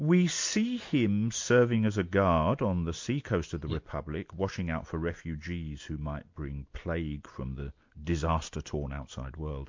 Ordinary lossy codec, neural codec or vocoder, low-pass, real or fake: MP3, 48 kbps; none; 7.2 kHz; real